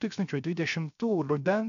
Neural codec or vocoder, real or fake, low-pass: codec, 16 kHz, 0.3 kbps, FocalCodec; fake; 7.2 kHz